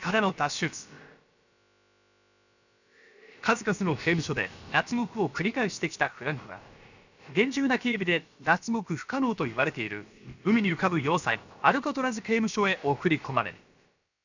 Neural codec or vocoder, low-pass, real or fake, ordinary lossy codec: codec, 16 kHz, about 1 kbps, DyCAST, with the encoder's durations; 7.2 kHz; fake; none